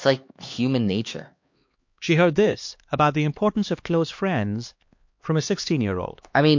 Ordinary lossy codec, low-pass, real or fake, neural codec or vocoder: MP3, 48 kbps; 7.2 kHz; fake; codec, 16 kHz, 2 kbps, X-Codec, HuBERT features, trained on LibriSpeech